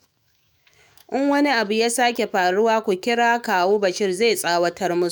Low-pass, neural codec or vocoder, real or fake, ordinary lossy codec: none; autoencoder, 48 kHz, 128 numbers a frame, DAC-VAE, trained on Japanese speech; fake; none